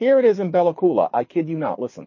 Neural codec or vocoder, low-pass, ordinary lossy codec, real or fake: codec, 24 kHz, 6 kbps, HILCodec; 7.2 kHz; MP3, 32 kbps; fake